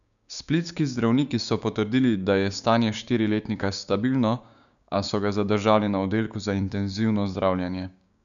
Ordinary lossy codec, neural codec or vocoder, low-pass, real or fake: none; codec, 16 kHz, 6 kbps, DAC; 7.2 kHz; fake